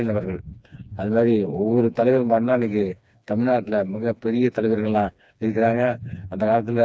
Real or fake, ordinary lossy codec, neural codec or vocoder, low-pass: fake; none; codec, 16 kHz, 2 kbps, FreqCodec, smaller model; none